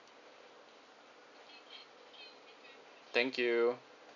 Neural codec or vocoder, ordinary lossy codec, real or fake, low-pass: none; none; real; 7.2 kHz